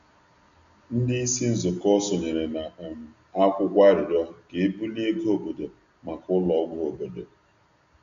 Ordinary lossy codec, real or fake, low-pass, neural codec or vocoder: none; real; 7.2 kHz; none